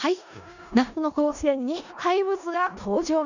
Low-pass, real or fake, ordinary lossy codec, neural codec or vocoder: 7.2 kHz; fake; none; codec, 16 kHz in and 24 kHz out, 0.4 kbps, LongCat-Audio-Codec, four codebook decoder